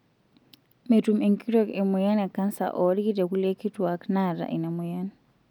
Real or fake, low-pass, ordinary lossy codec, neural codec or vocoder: real; 19.8 kHz; none; none